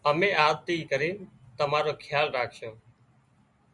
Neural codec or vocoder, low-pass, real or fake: none; 10.8 kHz; real